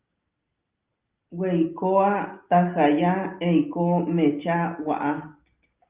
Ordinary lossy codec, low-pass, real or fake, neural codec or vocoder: Opus, 24 kbps; 3.6 kHz; real; none